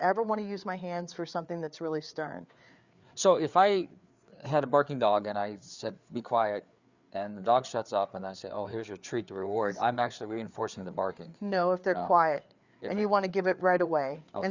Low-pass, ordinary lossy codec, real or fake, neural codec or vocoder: 7.2 kHz; Opus, 64 kbps; fake; codec, 16 kHz, 4 kbps, FreqCodec, larger model